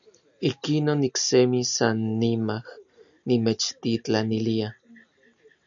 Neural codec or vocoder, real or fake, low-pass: none; real; 7.2 kHz